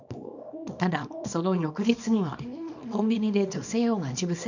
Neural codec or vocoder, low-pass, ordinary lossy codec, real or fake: codec, 24 kHz, 0.9 kbps, WavTokenizer, small release; 7.2 kHz; none; fake